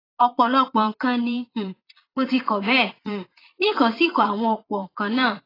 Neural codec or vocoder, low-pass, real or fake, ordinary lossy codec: vocoder, 22.05 kHz, 80 mel bands, Vocos; 5.4 kHz; fake; AAC, 24 kbps